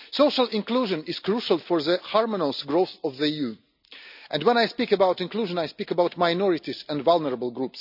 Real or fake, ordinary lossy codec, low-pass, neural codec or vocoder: real; none; 5.4 kHz; none